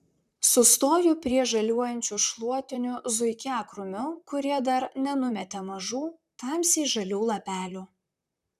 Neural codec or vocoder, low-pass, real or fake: vocoder, 44.1 kHz, 128 mel bands, Pupu-Vocoder; 14.4 kHz; fake